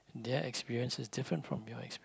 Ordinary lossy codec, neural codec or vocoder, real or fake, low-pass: none; none; real; none